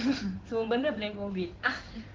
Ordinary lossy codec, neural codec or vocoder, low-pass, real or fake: Opus, 16 kbps; codec, 16 kHz in and 24 kHz out, 2.2 kbps, FireRedTTS-2 codec; 7.2 kHz; fake